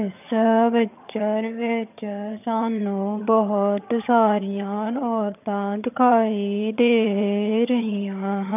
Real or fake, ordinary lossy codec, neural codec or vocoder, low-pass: fake; none; vocoder, 22.05 kHz, 80 mel bands, HiFi-GAN; 3.6 kHz